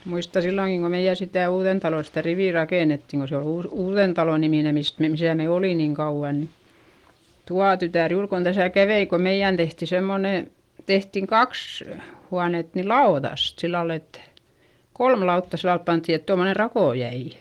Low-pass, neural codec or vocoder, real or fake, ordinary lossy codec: 19.8 kHz; none; real; Opus, 24 kbps